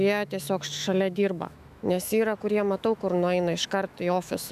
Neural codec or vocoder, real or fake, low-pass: autoencoder, 48 kHz, 128 numbers a frame, DAC-VAE, trained on Japanese speech; fake; 14.4 kHz